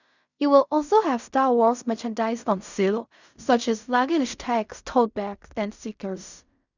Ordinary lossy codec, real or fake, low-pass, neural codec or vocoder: none; fake; 7.2 kHz; codec, 16 kHz in and 24 kHz out, 0.4 kbps, LongCat-Audio-Codec, fine tuned four codebook decoder